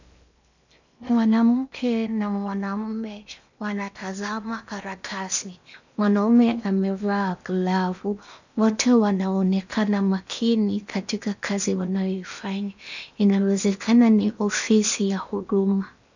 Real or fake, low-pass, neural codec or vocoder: fake; 7.2 kHz; codec, 16 kHz in and 24 kHz out, 0.8 kbps, FocalCodec, streaming, 65536 codes